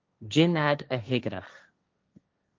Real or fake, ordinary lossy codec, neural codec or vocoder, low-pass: fake; Opus, 32 kbps; codec, 16 kHz, 1.1 kbps, Voila-Tokenizer; 7.2 kHz